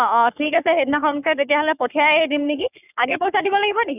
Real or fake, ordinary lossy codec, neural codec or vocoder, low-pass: fake; none; codec, 44.1 kHz, 3.4 kbps, Pupu-Codec; 3.6 kHz